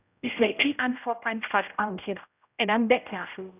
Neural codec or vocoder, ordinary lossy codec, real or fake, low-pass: codec, 16 kHz, 0.5 kbps, X-Codec, HuBERT features, trained on general audio; none; fake; 3.6 kHz